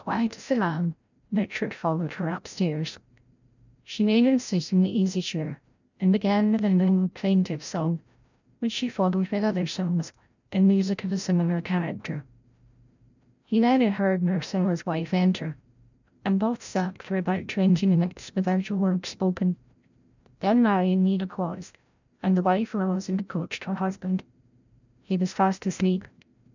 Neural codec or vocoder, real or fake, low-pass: codec, 16 kHz, 0.5 kbps, FreqCodec, larger model; fake; 7.2 kHz